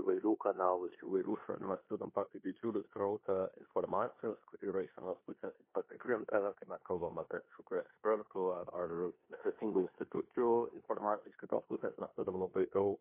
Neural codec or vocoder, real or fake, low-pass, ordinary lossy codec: codec, 16 kHz in and 24 kHz out, 0.9 kbps, LongCat-Audio-Codec, four codebook decoder; fake; 3.6 kHz; MP3, 24 kbps